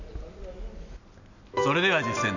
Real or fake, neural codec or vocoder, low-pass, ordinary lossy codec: real; none; 7.2 kHz; none